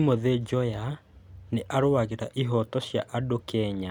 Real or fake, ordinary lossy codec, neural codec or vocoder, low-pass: real; none; none; 19.8 kHz